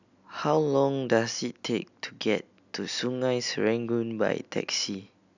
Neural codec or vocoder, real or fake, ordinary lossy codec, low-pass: none; real; none; 7.2 kHz